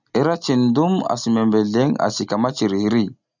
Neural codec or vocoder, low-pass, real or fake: none; 7.2 kHz; real